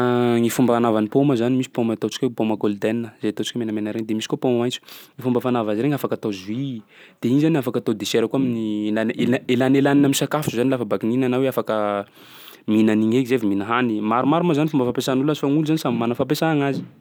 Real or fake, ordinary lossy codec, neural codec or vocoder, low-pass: real; none; none; none